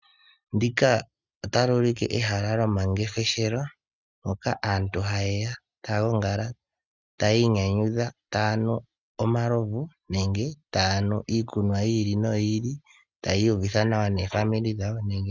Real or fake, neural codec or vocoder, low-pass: real; none; 7.2 kHz